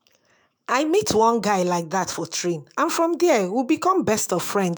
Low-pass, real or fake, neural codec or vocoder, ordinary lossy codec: none; fake; autoencoder, 48 kHz, 128 numbers a frame, DAC-VAE, trained on Japanese speech; none